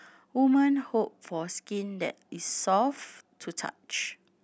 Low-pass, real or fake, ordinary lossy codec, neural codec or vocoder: none; real; none; none